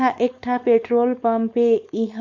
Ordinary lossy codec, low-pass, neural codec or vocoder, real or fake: MP3, 48 kbps; 7.2 kHz; codec, 16 kHz, 4.8 kbps, FACodec; fake